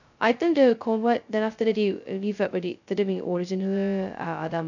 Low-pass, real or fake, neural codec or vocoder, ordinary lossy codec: 7.2 kHz; fake; codec, 16 kHz, 0.2 kbps, FocalCodec; none